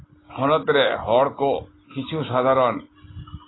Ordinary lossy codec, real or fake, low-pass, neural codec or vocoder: AAC, 16 kbps; real; 7.2 kHz; none